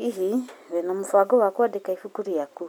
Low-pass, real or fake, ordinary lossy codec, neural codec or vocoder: none; real; none; none